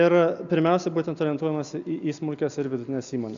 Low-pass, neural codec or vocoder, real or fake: 7.2 kHz; none; real